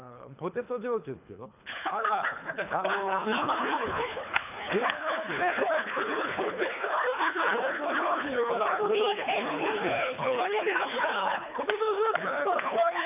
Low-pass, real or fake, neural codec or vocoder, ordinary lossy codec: 3.6 kHz; fake; codec, 24 kHz, 3 kbps, HILCodec; none